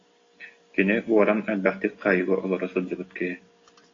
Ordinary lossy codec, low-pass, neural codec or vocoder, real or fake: AAC, 32 kbps; 7.2 kHz; none; real